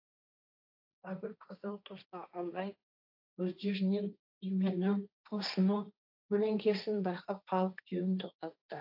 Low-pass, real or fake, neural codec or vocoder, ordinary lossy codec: 5.4 kHz; fake; codec, 16 kHz, 1.1 kbps, Voila-Tokenizer; AAC, 48 kbps